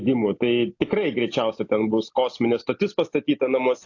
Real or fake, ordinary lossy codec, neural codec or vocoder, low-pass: real; AAC, 48 kbps; none; 7.2 kHz